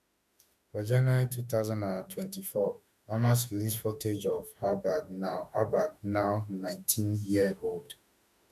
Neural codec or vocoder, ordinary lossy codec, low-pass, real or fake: autoencoder, 48 kHz, 32 numbers a frame, DAC-VAE, trained on Japanese speech; none; 14.4 kHz; fake